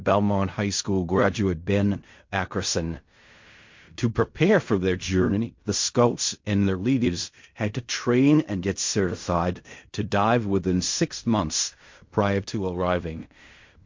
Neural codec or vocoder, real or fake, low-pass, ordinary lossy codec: codec, 16 kHz in and 24 kHz out, 0.4 kbps, LongCat-Audio-Codec, fine tuned four codebook decoder; fake; 7.2 kHz; MP3, 48 kbps